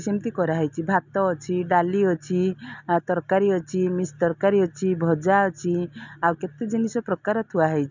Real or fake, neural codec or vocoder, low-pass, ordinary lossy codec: real; none; 7.2 kHz; none